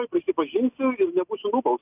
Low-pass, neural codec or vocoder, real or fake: 3.6 kHz; none; real